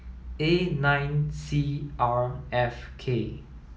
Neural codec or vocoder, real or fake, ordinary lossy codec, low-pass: none; real; none; none